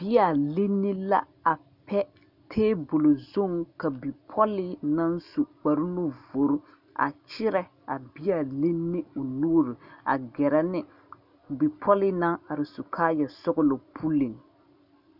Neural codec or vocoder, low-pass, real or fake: none; 5.4 kHz; real